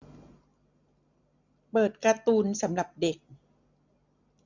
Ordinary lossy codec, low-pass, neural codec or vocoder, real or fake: none; 7.2 kHz; none; real